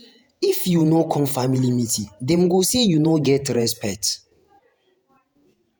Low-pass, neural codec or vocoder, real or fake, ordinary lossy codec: none; vocoder, 48 kHz, 128 mel bands, Vocos; fake; none